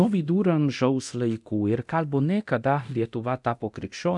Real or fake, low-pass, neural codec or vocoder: fake; 10.8 kHz; codec, 24 kHz, 0.9 kbps, DualCodec